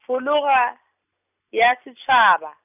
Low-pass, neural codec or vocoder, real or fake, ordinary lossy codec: 3.6 kHz; none; real; none